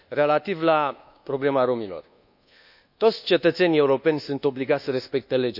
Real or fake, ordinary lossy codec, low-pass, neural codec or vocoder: fake; none; 5.4 kHz; codec, 24 kHz, 1.2 kbps, DualCodec